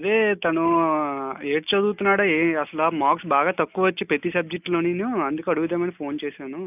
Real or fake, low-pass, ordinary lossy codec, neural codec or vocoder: real; 3.6 kHz; none; none